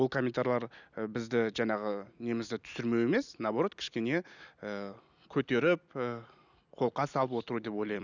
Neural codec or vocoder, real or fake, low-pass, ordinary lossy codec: none; real; 7.2 kHz; none